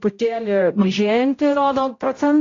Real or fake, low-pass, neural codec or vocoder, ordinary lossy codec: fake; 7.2 kHz; codec, 16 kHz, 0.5 kbps, X-Codec, HuBERT features, trained on general audio; AAC, 32 kbps